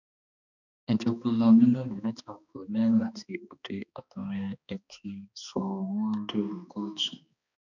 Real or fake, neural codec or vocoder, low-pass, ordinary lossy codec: fake; codec, 16 kHz, 2 kbps, X-Codec, HuBERT features, trained on balanced general audio; 7.2 kHz; none